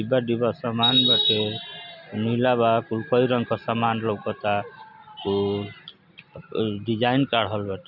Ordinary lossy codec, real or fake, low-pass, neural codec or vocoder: none; real; 5.4 kHz; none